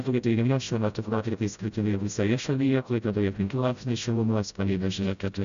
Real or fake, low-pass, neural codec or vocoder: fake; 7.2 kHz; codec, 16 kHz, 0.5 kbps, FreqCodec, smaller model